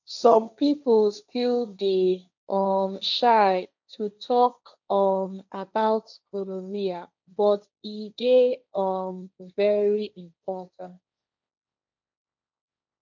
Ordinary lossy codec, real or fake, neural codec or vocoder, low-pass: none; fake; codec, 16 kHz, 1.1 kbps, Voila-Tokenizer; 7.2 kHz